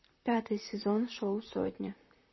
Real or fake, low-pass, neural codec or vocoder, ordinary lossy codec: fake; 7.2 kHz; vocoder, 44.1 kHz, 128 mel bands, Pupu-Vocoder; MP3, 24 kbps